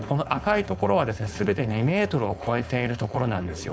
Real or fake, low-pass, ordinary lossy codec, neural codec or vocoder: fake; none; none; codec, 16 kHz, 4.8 kbps, FACodec